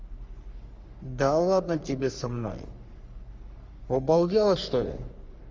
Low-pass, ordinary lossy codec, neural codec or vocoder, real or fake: 7.2 kHz; Opus, 32 kbps; codec, 44.1 kHz, 3.4 kbps, Pupu-Codec; fake